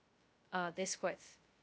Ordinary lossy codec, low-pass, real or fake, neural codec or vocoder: none; none; fake; codec, 16 kHz, 0.2 kbps, FocalCodec